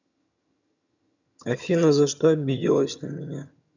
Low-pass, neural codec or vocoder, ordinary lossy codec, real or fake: 7.2 kHz; vocoder, 22.05 kHz, 80 mel bands, HiFi-GAN; none; fake